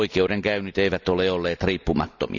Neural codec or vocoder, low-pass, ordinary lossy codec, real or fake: none; 7.2 kHz; none; real